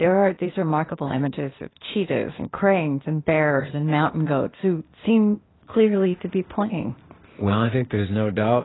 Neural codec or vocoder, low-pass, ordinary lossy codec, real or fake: codec, 16 kHz, 0.8 kbps, ZipCodec; 7.2 kHz; AAC, 16 kbps; fake